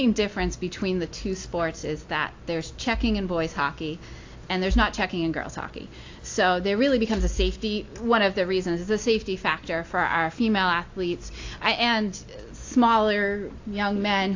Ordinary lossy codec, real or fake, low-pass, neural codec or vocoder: AAC, 48 kbps; real; 7.2 kHz; none